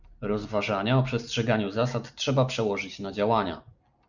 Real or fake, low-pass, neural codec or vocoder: real; 7.2 kHz; none